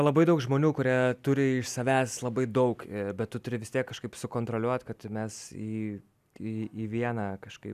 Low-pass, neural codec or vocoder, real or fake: 14.4 kHz; none; real